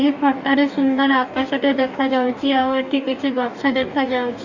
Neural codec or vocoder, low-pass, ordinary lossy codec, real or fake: codec, 44.1 kHz, 2.6 kbps, DAC; 7.2 kHz; none; fake